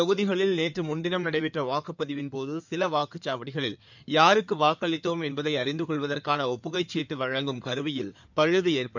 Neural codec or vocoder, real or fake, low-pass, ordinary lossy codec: codec, 16 kHz in and 24 kHz out, 2.2 kbps, FireRedTTS-2 codec; fake; 7.2 kHz; none